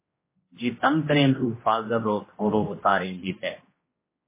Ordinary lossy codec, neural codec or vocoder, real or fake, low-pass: MP3, 16 kbps; codec, 16 kHz, 1 kbps, X-Codec, HuBERT features, trained on general audio; fake; 3.6 kHz